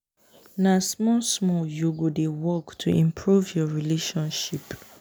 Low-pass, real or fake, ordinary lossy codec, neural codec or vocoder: none; real; none; none